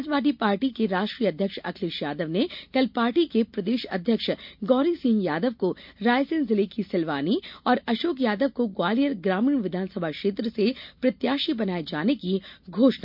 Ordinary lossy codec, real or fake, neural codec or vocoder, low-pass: MP3, 48 kbps; real; none; 5.4 kHz